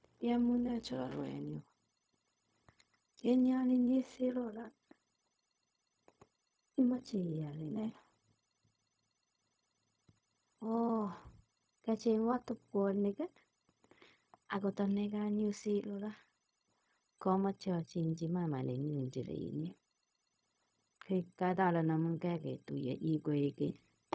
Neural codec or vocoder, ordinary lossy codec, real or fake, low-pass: codec, 16 kHz, 0.4 kbps, LongCat-Audio-Codec; none; fake; none